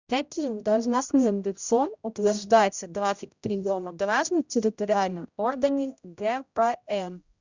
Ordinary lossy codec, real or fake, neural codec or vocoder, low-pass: Opus, 64 kbps; fake; codec, 16 kHz, 0.5 kbps, X-Codec, HuBERT features, trained on general audio; 7.2 kHz